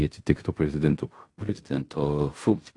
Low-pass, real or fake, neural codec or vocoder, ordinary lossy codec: 10.8 kHz; fake; codec, 16 kHz in and 24 kHz out, 0.4 kbps, LongCat-Audio-Codec, fine tuned four codebook decoder; AAC, 48 kbps